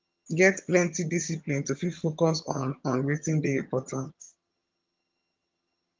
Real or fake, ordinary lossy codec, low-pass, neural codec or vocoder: fake; Opus, 24 kbps; 7.2 kHz; vocoder, 22.05 kHz, 80 mel bands, HiFi-GAN